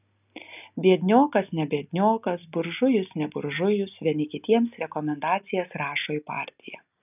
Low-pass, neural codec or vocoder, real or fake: 3.6 kHz; none; real